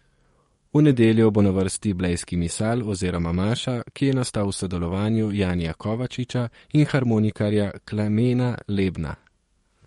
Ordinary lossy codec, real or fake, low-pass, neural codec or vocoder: MP3, 48 kbps; fake; 19.8 kHz; codec, 44.1 kHz, 7.8 kbps, DAC